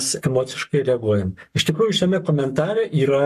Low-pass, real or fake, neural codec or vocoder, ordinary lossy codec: 14.4 kHz; fake; codec, 44.1 kHz, 7.8 kbps, Pupu-Codec; MP3, 96 kbps